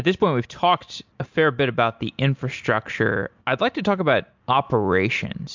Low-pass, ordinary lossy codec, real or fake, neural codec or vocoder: 7.2 kHz; MP3, 64 kbps; real; none